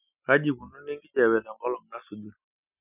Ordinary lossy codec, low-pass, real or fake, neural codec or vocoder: none; 3.6 kHz; real; none